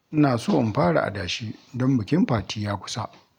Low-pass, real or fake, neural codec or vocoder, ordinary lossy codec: 19.8 kHz; real; none; none